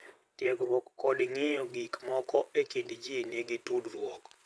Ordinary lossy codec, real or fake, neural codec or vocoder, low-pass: none; fake; vocoder, 22.05 kHz, 80 mel bands, WaveNeXt; none